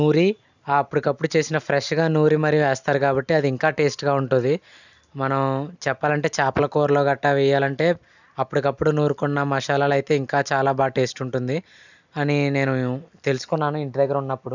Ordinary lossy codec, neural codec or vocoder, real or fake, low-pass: none; none; real; 7.2 kHz